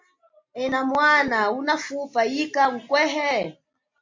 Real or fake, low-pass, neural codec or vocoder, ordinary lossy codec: real; 7.2 kHz; none; MP3, 48 kbps